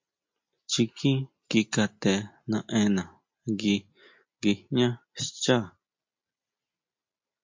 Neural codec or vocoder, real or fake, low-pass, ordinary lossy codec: none; real; 7.2 kHz; MP3, 48 kbps